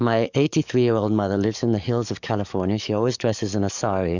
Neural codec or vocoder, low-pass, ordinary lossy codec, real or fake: codec, 44.1 kHz, 7.8 kbps, DAC; 7.2 kHz; Opus, 64 kbps; fake